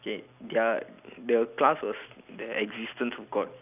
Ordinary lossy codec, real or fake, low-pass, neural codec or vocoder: none; real; 3.6 kHz; none